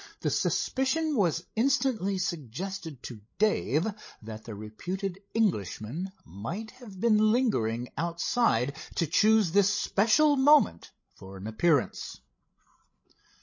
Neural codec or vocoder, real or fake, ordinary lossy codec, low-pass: codec, 16 kHz, 16 kbps, FreqCodec, larger model; fake; MP3, 32 kbps; 7.2 kHz